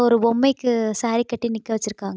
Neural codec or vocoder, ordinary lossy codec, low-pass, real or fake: none; none; none; real